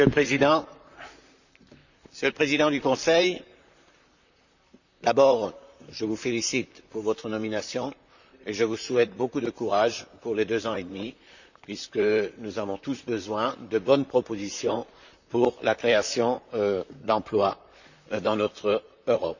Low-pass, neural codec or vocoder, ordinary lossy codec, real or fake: 7.2 kHz; vocoder, 44.1 kHz, 128 mel bands, Pupu-Vocoder; none; fake